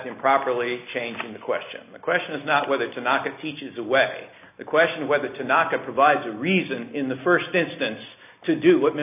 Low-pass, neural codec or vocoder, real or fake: 3.6 kHz; none; real